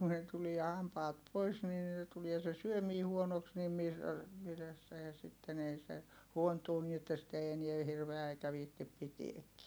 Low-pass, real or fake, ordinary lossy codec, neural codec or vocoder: none; real; none; none